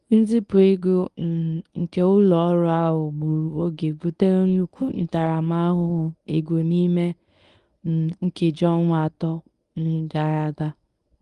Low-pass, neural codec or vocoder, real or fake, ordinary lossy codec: 10.8 kHz; codec, 24 kHz, 0.9 kbps, WavTokenizer, medium speech release version 1; fake; Opus, 32 kbps